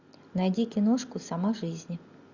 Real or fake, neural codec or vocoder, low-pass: real; none; 7.2 kHz